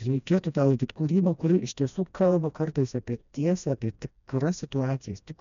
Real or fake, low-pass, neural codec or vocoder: fake; 7.2 kHz; codec, 16 kHz, 1 kbps, FreqCodec, smaller model